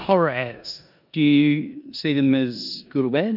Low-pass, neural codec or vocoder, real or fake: 5.4 kHz; codec, 16 kHz in and 24 kHz out, 0.9 kbps, LongCat-Audio-Codec, four codebook decoder; fake